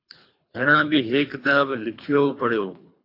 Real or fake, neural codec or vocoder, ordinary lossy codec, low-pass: fake; codec, 24 kHz, 3 kbps, HILCodec; AAC, 32 kbps; 5.4 kHz